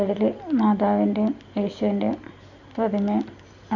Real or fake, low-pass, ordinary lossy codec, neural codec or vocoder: real; 7.2 kHz; none; none